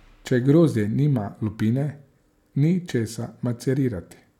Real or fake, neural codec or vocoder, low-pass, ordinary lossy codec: real; none; 19.8 kHz; none